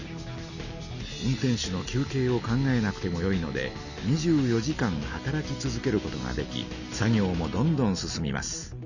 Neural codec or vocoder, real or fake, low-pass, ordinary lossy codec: none; real; 7.2 kHz; none